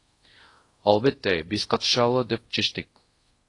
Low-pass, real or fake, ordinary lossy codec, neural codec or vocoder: 10.8 kHz; fake; AAC, 32 kbps; codec, 24 kHz, 0.5 kbps, DualCodec